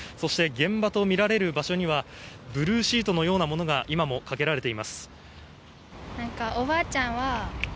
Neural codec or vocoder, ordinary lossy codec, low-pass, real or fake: none; none; none; real